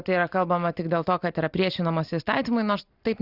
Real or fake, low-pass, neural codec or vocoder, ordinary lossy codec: real; 5.4 kHz; none; Opus, 64 kbps